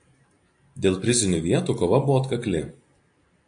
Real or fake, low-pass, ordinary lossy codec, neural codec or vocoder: real; 9.9 kHz; AAC, 64 kbps; none